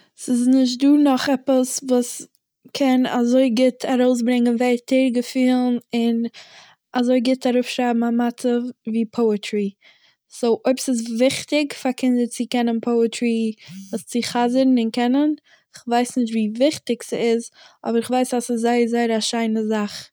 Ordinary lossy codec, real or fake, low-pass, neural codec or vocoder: none; real; none; none